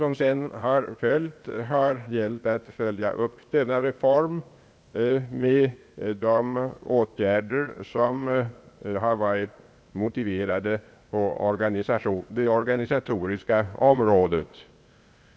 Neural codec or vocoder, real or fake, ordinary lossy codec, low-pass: codec, 16 kHz, 0.8 kbps, ZipCodec; fake; none; none